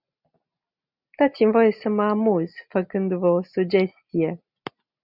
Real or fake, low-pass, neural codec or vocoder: real; 5.4 kHz; none